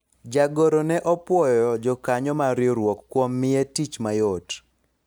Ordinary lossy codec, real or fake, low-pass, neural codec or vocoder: none; real; none; none